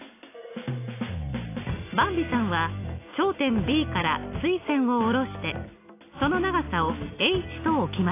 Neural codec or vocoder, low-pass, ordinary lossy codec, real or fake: none; 3.6 kHz; AAC, 24 kbps; real